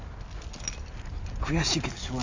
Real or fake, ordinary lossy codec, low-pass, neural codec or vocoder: real; AAC, 48 kbps; 7.2 kHz; none